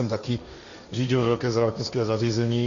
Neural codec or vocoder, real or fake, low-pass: codec, 16 kHz, 1.1 kbps, Voila-Tokenizer; fake; 7.2 kHz